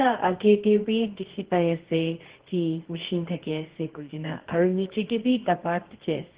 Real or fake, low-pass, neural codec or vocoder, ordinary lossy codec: fake; 3.6 kHz; codec, 24 kHz, 0.9 kbps, WavTokenizer, medium music audio release; Opus, 16 kbps